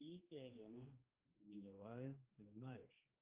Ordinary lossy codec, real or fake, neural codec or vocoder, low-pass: Opus, 24 kbps; fake; codec, 16 kHz, 1 kbps, X-Codec, HuBERT features, trained on general audio; 3.6 kHz